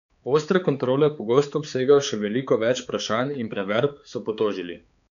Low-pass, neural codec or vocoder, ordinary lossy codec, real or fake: 7.2 kHz; codec, 16 kHz, 4 kbps, X-Codec, HuBERT features, trained on balanced general audio; none; fake